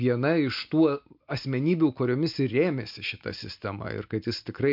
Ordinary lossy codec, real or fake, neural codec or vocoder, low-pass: MP3, 48 kbps; real; none; 5.4 kHz